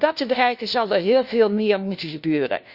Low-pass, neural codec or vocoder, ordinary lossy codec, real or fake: 5.4 kHz; codec, 16 kHz, 1 kbps, FunCodec, trained on LibriTTS, 50 frames a second; Opus, 64 kbps; fake